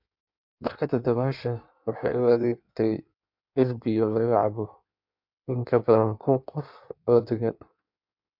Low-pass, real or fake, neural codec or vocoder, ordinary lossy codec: 5.4 kHz; fake; codec, 16 kHz in and 24 kHz out, 1.1 kbps, FireRedTTS-2 codec; MP3, 48 kbps